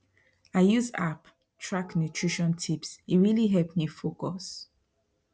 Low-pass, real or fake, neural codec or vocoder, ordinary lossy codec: none; real; none; none